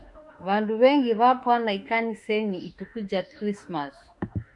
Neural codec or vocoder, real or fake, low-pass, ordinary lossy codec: autoencoder, 48 kHz, 32 numbers a frame, DAC-VAE, trained on Japanese speech; fake; 10.8 kHz; Opus, 64 kbps